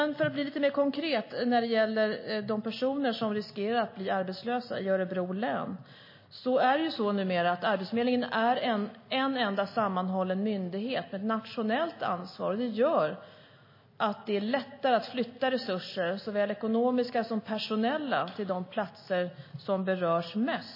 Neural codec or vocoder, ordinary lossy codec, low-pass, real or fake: none; MP3, 24 kbps; 5.4 kHz; real